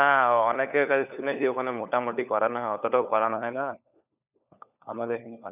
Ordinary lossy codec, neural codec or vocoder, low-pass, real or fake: none; codec, 16 kHz, 4 kbps, FunCodec, trained on LibriTTS, 50 frames a second; 3.6 kHz; fake